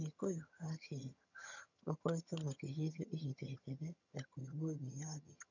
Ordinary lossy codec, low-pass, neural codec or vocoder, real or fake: none; 7.2 kHz; vocoder, 22.05 kHz, 80 mel bands, HiFi-GAN; fake